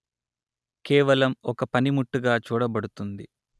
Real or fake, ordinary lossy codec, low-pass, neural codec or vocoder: real; none; none; none